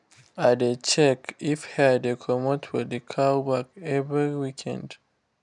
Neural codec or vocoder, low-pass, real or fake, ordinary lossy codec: none; 10.8 kHz; real; none